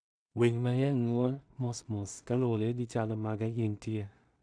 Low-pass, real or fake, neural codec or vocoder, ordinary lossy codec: 9.9 kHz; fake; codec, 16 kHz in and 24 kHz out, 0.4 kbps, LongCat-Audio-Codec, two codebook decoder; none